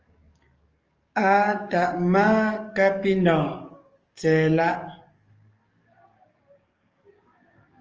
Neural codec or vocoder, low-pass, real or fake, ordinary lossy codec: codec, 44.1 kHz, 7.8 kbps, DAC; 7.2 kHz; fake; Opus, 24 kbps